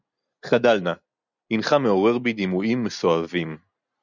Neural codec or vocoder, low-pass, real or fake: none; 7.2 kHz; real